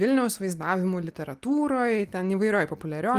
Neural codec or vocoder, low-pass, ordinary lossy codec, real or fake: none; 14.4 kHz; Opus, 24 kbps; real